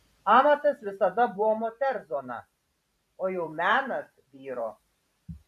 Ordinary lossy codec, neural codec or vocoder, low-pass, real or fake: MP3, 96 kbps; vocoder, 44.1 kHz, 128 mel bands every 512 samples, BigVGAN v2; 14.4 kHz; fake